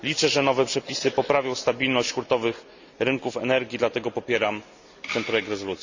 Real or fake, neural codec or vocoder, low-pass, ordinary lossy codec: real; none; 7.2 kHz; Opus, 64 kbps